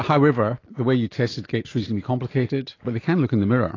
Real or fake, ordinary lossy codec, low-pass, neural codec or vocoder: real; AAC, 32 kbps; 7.2 kHz; none